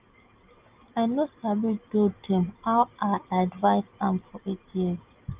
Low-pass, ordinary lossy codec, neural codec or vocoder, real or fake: 3.6 kHz; Opus, 24 kbps; none; real